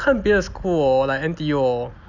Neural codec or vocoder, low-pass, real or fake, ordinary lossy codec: none; 7.2 kHz; real; none